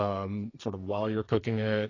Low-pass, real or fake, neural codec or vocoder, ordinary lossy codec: 7.2 kHz; fake; codec, 44.1 kHz, 2.6 kbps, SNAC; AAC, 32 kbps